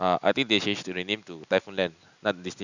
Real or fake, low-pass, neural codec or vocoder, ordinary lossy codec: real; 7.2 kHz; none; none